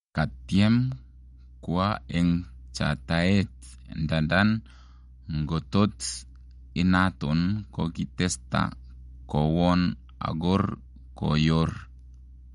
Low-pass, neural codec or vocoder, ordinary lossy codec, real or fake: 19.8 kHz; none; MP3, 48 kbps; real